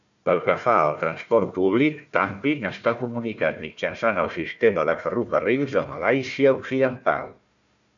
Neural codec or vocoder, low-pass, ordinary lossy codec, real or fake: codec, 16 kHz, 1 kbps, FunCodec, trained on Chinese and English, 50 frames a second; 7.2 kHz; MP3, 96 kbps; fake